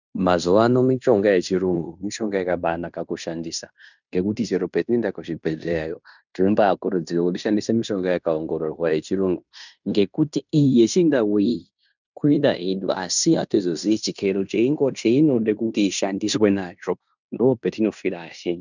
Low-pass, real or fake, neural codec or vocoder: 7.2 kHz; fake; codec, 16 kHz in and 24 kHz out, 0.9 kbps, LongCat-Audio-Codec, fine tuned four codebook decoder